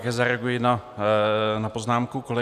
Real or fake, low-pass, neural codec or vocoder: real; 14.4 kHz; none